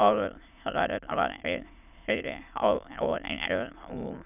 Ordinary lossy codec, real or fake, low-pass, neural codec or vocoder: none; fake; 3.6 kHz; autoencoder, 22.05 kHz, a latent of 192 numbers a frame, VITS, trained on many speakers